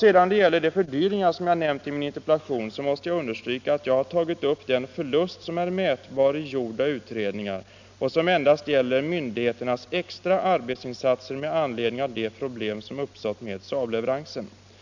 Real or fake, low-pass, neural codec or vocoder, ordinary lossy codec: real; 7.2 kHz; none; none